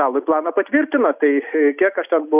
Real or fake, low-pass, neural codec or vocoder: real; 3.6 kHz; none